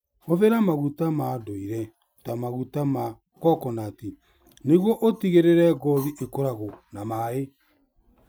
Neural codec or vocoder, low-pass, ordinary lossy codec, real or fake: none; none; none; real